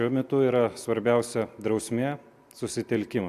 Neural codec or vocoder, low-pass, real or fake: none; 14.4 kHz; real